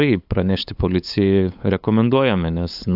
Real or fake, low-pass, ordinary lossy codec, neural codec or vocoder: fake; 5.4 kHz; AAC, 48 kbps; codec, 16 kHz, 8 kbps, FunCodec, trained on LibriTTS, 25 frames a second